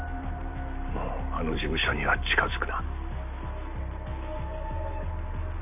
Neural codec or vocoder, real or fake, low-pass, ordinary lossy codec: none; real; 3.6 kHz; none